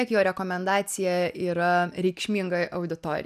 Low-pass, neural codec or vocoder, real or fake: 14.4 kHz; none; real